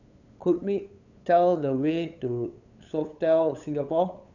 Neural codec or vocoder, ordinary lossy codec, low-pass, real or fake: codec, 16 kHz, 8 kbps, FunCodec, trained on LibriTTS, 25 frames a second; none; 7.2 kHz; fake